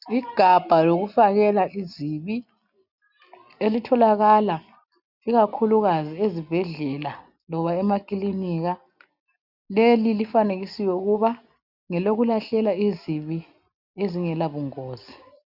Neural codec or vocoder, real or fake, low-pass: none; real; 5.4 kHz